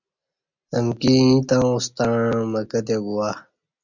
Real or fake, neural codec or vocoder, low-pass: real; none; 7.2 kHz